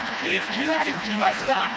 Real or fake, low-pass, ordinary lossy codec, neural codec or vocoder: fake; none; none; codec, 16 kHz, 1 kbps, FreqCodec, smaller model